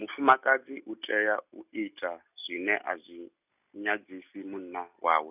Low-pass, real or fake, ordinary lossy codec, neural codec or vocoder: 3.6 kHz; real; none; none